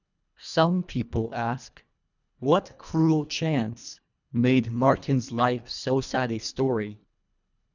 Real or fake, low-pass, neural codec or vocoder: fake; 7.2 kHz; codec, 24 kHz, 1.5 kbps, HILCodec